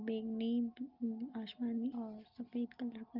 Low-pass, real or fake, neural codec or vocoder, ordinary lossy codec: 5.4 kHz; real; none; Opus, 24 kbps